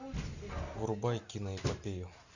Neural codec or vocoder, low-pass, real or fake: none; 7.2 kHz; real